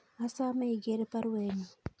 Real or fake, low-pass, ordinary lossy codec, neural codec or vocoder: real; none; none; none